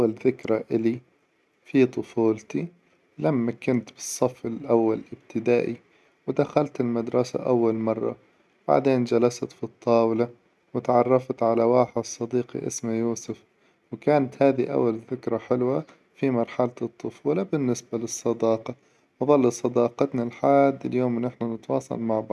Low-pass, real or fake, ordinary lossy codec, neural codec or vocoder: none; real; none; none